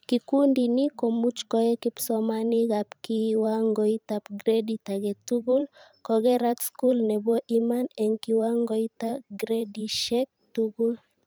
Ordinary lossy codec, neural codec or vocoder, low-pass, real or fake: none; vocoder, 44.1 kHz, 128 mel bands every 512 samples, BigVGAN v2; none; fake